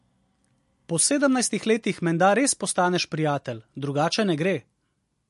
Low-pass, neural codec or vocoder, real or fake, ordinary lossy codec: 14.4 kHz; none; real; MP3, 48 kbps